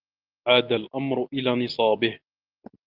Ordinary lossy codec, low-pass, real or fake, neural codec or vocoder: Opus, 24 kbps; 5.4 kHz; real; none